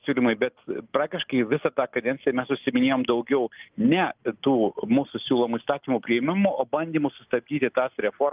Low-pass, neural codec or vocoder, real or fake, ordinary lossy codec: 3.6 kHz; none; real; Opus, 24 kbps